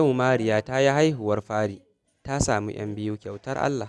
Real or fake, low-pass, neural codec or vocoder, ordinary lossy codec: real; none; none; none